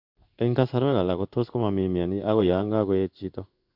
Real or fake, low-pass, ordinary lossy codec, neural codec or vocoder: fake; 5.4 kHz; Opus, 64 kbps; codec, 16 kHz in and 24 kHz out, 1 kbps, XY-Tokenizer